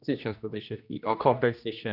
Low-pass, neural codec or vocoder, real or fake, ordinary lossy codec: 5.4 kHz; codec, 16 kHz, 1 kbps, X-Codec, HuBERT features, trained on general audio; fake; none